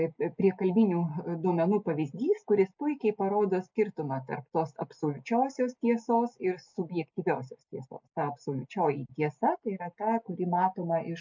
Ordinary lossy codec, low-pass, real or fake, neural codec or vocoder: MP3, 64 kbps; 7.2 kHz; real; none